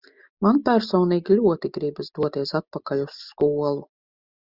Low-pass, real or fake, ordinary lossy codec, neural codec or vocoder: 5.4 kHz; real; Opus, 64 kbps; none